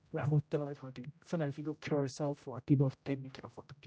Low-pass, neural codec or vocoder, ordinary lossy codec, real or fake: none; codec, 16 kHz, 0.5 kbps, X-Codec, HuBERT features, trained on general audio; none; fake